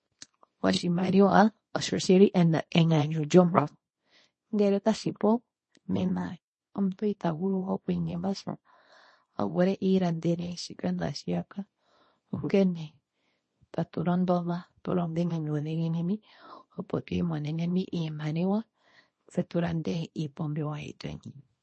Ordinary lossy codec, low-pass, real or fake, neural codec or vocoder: MP3, 32 kbps; 10.8 kHz; fake; codec, 24 kHz, 0.9 kbps, WavTokenizer, small release